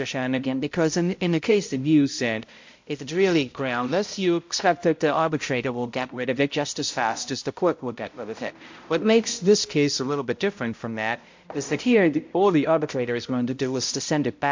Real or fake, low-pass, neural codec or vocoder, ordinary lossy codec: fake; 7.2 kHz; codec, 16 kHz, 0.5 kbps, X-Codec, HuBERT features, trained on balanced general audio; MP3, 48 kbps